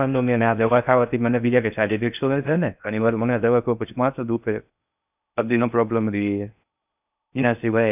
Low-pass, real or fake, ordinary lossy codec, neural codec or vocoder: 3.6 kHz; fake; none; codec, 16 kHz in and 24 kHz out, 0.6 kbps, FocalCodec, streaming, 4096 codes